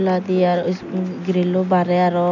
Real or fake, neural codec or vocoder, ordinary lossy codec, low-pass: real; none; none; 7.2 kHz